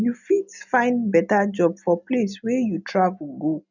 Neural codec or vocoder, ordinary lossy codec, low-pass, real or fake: none; none; 7.2 kHz; real